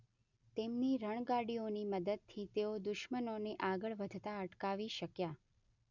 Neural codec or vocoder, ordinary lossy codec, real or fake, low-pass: none; none; real; 7.2 kHz